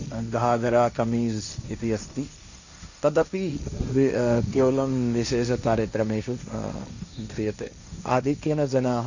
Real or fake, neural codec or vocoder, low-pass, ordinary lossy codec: fake; codec, 16 kHz, 1.1 kbps, Voila-Tokenizer; 7.2 kHz; none